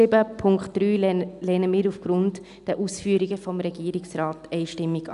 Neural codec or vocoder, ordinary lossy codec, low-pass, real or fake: none; none; 10.8 kHz; real